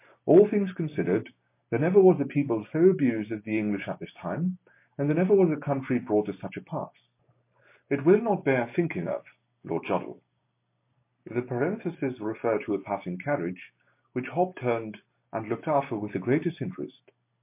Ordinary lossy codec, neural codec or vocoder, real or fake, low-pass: MP3, 24 kbps; none; real; 3.6 kHz